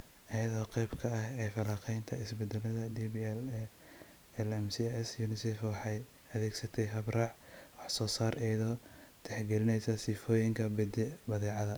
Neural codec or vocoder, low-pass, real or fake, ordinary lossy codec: vocoder, 44.1 kHz, 128 mel bands every 512 samples, BigVGAN v2; none; fake; none